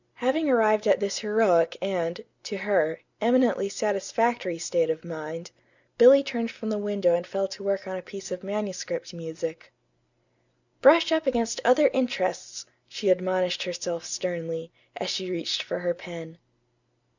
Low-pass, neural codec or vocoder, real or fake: 7.2 kHz; none; real